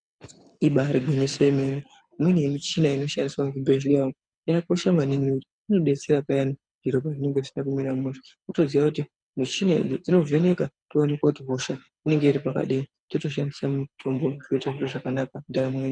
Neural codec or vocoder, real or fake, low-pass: codec, 24 kHz, 6 kbps, HILCodec; fake; 9.9 kHz